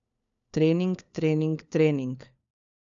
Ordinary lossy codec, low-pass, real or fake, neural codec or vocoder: none; 7.2 kHz; fake; codec, 16 kHz, 4 kbps, FunCodec, trained on LibriTTS, 50 frames a second